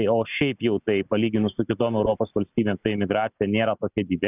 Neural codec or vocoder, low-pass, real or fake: none; 3.6 kHz; real